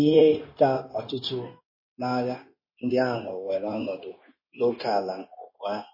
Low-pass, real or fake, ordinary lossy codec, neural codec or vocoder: 5.4 kHz; fake; MP3, 24 kbps; codec, 16 kHz, 0.9 kbps, LongCat-Audio-Codec